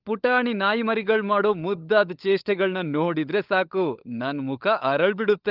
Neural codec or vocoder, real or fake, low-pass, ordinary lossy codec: codec, 16 kHz, 16 kbps, FunCodec, trained on LibriTTS, 50 frames a second; fake; 5.4 kHz; Opus, 24 kbps